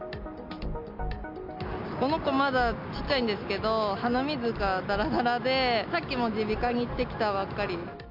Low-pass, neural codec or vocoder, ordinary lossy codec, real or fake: 5.4 kHz; none; MP3, 48 kbps; real